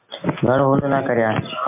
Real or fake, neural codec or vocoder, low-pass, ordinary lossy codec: real; none; 3.6 kHz; MP3, 24 kbps